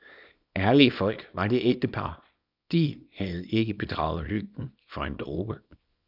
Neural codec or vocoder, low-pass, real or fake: codec, 24 kHz, 0.9 kbps, WavTokenizer, small release; 5.4 kHz; fake